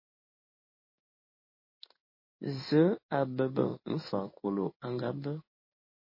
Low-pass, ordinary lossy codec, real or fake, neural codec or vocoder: 5.4 kHz; MP3, 24 kbps; fake; codec, 16 kHz in and 24 kHz out, 1 kbps, XY-Tokenizer